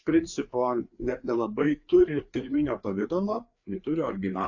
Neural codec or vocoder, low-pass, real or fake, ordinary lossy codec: codec, 16 kHz, 4 kbps, FreqCodec, larger model; 7.2 kHz; fake; AAC, 48 kbps